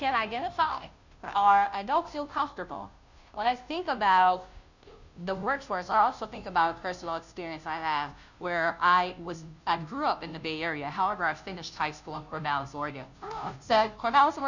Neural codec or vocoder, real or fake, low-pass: codec, 16 kHz, 0.5 kbps, FunCodec, trained on Chinese and English, 25 frames a second; fake; 7.2 kHz